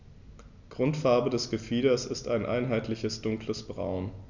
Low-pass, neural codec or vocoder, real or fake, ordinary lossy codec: 7.2 kHz; none; real; none